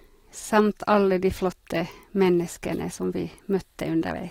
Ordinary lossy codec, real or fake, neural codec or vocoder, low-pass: AAC, 48 kbps; real; none; 19.8 kHz